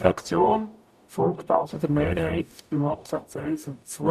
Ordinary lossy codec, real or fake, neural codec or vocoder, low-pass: none; fake; codec, 44.1 kHz, 0.9 kbps, DAC; 14.4 kHz